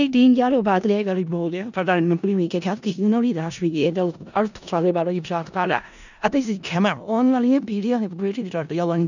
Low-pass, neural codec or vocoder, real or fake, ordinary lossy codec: 7.2 kHz; codec, 16 kHz in and 24 kHz out, 0.4 kbps, LongCat-Audio-Codec, four codebook decoder; fake; none